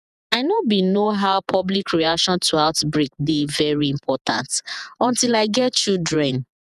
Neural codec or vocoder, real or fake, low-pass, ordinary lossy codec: vocoder, 48 kHz, 128 mel bands, Vocos; fake; 14.4 kHz; none